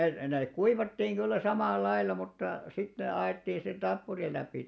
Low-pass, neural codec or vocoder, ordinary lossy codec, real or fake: none; none; none; real